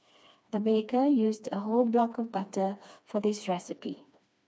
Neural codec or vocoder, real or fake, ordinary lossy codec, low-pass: codec, 16 kHz, 2 kbps, FreqCodec, smaller model; fake; none; none